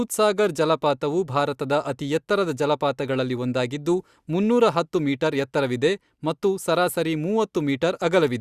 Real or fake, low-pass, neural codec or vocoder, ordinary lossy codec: real; 14.4 kHz; none; Opus, 64 kbps